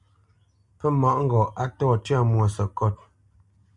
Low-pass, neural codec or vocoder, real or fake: 10.8 kHz; none; real